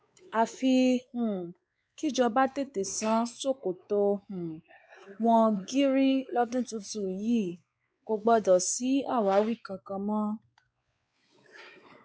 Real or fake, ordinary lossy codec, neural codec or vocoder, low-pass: fake; none; codec, 16 kHz, 4 kbps, X-Codec, WavLM features, trained on Multilingual LibriSpeech; none